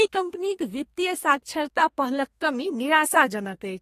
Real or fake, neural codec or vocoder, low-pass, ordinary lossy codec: fake; codec, 32 kHz, 1.9 kbps, SNAC; 14.4 kHz; AAC, 48 kbps